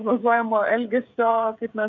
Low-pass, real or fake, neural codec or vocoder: 7.2 kHz; fake; codec, 16 kHz, 16 kbps, FreqCodec, smaller model